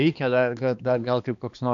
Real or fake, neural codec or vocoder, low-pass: fake; codec, 16 kHz, 2 kbps, X-Codec, HuBERT features, trained on balanced general audio; 7.2 kHz